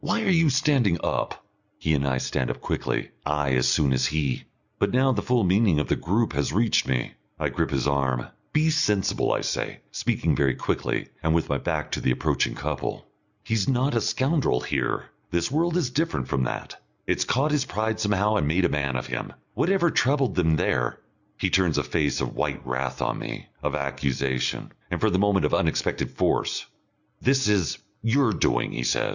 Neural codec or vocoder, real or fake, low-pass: none; real; 7.2 kHz